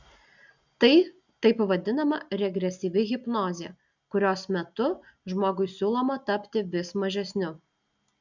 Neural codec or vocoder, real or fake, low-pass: none; real; 7.2 kHz